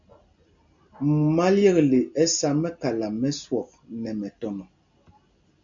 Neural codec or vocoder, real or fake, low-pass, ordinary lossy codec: none; real; 7.2 kHz; MP3, 96 kbps